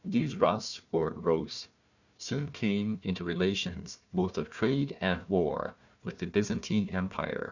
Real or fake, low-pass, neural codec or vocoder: fake; 7.2 kHz; codec, 16 kHz, 1 kbps, FunCodec, trained on Chinese and English, 50 frames a second